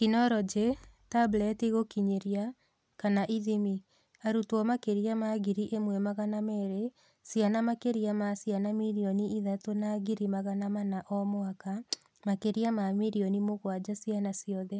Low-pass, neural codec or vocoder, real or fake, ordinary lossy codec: none; none; real; none